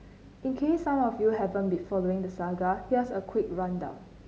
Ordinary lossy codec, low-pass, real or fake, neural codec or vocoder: none; none; real; none